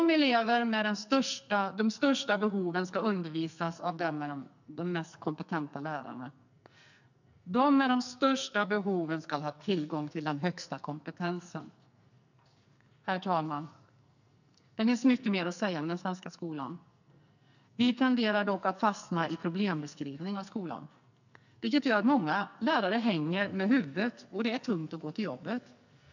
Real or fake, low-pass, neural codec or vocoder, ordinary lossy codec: fake; 7.2 kHz; codec, 44.1 kHz, 2.6 kbps, SNAC; none